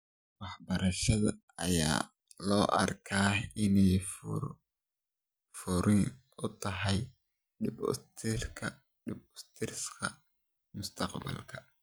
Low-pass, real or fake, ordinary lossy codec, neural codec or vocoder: none; real; none; none